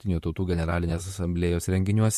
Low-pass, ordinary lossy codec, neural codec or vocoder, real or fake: 14.4 kHz; MP3, 64 kbps; vocoder, 44.1 kHz, 128 mel bands, Pupu-Vocoder; fake